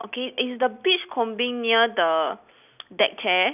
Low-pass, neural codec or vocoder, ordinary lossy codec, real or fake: 3.6 kHz; none; none; real